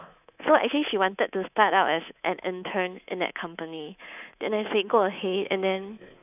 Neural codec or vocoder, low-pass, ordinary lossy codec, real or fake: codec, 24 kHz, 3.1 kbps, DualCodec; 3.6 kHz; none; fake